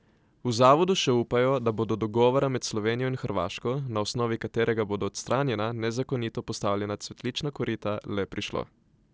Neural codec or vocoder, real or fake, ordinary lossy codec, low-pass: none; real; none; none